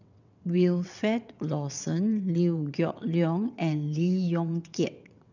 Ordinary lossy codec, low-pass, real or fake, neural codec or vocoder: none; 7.2 kHz; fake; vocoder, 22.05 kHz, 80 mel bands, WaveNeXt